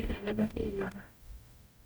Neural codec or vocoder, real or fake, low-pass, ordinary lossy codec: codec, 44.1 kHz, 0.9 kbps, DAC; fake; none; none